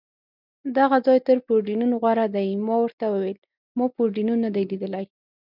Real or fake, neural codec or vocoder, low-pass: real; none; 5.4 kHz